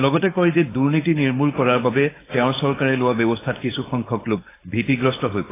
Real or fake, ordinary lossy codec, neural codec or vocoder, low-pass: fake; AAC, 16 kbps; codec, 16 kHz, 4.8 kbps, FACodec; 3.6 kHz